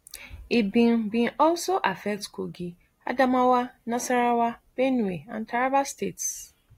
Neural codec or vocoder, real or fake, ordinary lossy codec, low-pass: none; real; AAC, 48 kbps; 19.8 kHz